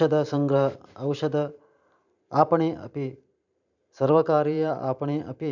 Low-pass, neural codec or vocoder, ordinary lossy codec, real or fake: 7.2 kHz; vocoder, 44.1 kHz, 128 mel bands every 512 samples, BigVGAN v2; none; fake